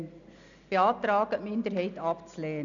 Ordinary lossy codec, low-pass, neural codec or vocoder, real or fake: MP3, 96 kbps; 7.2 kHz; none; real